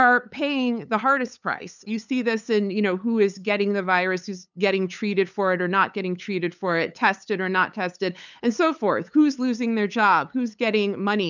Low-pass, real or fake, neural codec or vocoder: 7.2 kHz; fake; codec, 16 kHz, 8 kbps, FunCodec, trained on LibriTTS, 25 frames a second